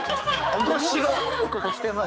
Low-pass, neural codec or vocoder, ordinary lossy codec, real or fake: none; codec, 16 kHz, 4 kbps, X-Codec, HuBERT features, trained on balanced general audio; none; fake